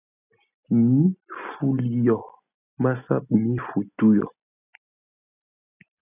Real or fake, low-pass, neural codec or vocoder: real; 3.6 kHz; none